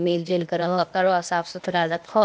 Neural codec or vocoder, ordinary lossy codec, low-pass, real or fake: codec, 16 kHz, 0.8 kbps, ZipCodec; none; none; fake